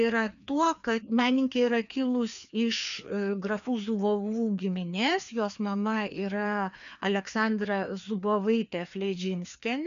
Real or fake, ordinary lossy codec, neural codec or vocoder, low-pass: fake; MP3, 96 kbps; codec, 16 kHz, 2 kbps, FreqCodec, larger model; 7.2 kHz